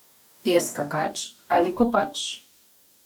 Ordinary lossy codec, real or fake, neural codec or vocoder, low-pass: none; fake; codec, 44.1 kHz, 2.6 kbps, DAC; none